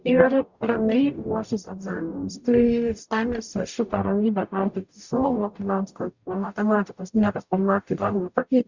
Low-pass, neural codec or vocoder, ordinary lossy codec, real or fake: 7.2 kHz; codec, 44.1 kHz, 0.9 kbps, DAC; Opus, 64 kbps; fake